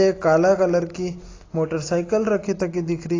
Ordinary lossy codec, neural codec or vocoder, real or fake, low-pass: AAC, 32 kbps; none; real; 7.2 kHz